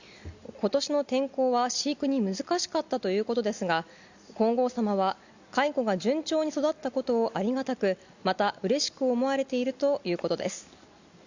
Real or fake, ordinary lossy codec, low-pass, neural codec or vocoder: real; Opus, 64 kbps; 7.2 kHz; none